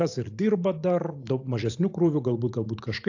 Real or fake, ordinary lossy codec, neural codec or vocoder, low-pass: fake; AAC, 48 kbps; vocoder, 44.1 kHz, 128 mel bands every 512 samples, BigVGAN v2; 7.2 kHz